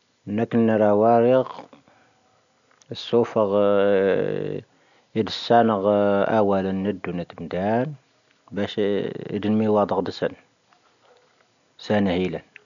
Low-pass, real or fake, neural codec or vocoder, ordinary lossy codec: 7.2 kHz; real; none; none